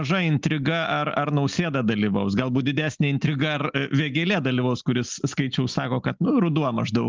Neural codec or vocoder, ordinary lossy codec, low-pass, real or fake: none; Opus, 32 kbps; 7.2 kHz; real